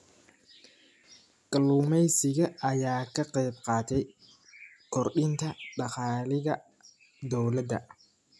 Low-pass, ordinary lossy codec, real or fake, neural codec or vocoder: none; none; real; none